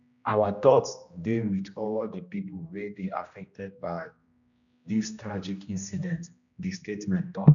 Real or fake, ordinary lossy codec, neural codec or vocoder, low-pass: fake; none; codec, 16 kHz, 1 kbps, X-Codec, HuBERT features, trained on general audio; 7.2 kHz